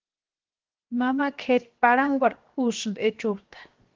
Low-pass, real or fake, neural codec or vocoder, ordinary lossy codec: 7.2 kHz; fake; codec, 16 kHz, 0.7 kbps, FocalCodec; Opus, 16 kbps